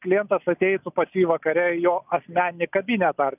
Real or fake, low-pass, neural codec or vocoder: real; 3.6 kHz; none